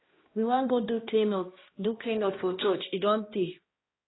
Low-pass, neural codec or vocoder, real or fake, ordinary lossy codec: 7.2 kHz; codec, 16 kHz, 1 kbps, X-Codec, HuBERT features, trained on balanced general audio; fake; AAC, 16 kbps